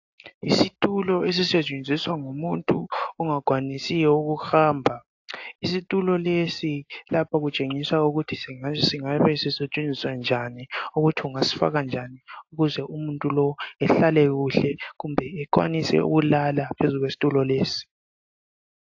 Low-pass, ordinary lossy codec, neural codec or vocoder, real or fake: 7.2 kHz; AAC, 48 kbps; none; real